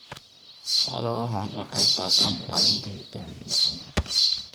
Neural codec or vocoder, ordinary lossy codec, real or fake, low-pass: codec, 44.1 kHz, 1.7 kbps, Pupu-Codec; none; fake; none